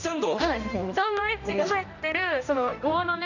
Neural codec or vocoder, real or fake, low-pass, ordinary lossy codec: codec, 16 kHz, 1 kbps, X-Codec, HuBERT features, trained on general audio; fake; 7.2 kHz; none